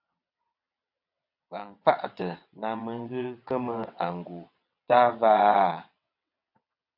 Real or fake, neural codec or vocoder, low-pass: fake; vocoder, 22.05 kHz, 80 mel bands, WaveNeXt; 5.4 kHz